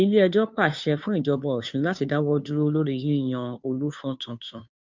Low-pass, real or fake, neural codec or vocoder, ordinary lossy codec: 7.2 kHz; fake; codec, 16 kHz, 2 kbps, FunCodec, trained on Chinese and English, 25 frames a second; MP3, 64 kbps